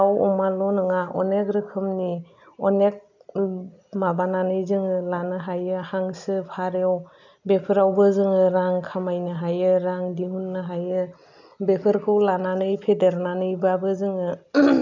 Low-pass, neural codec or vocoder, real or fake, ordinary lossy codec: 7.2 kHz; none; real; none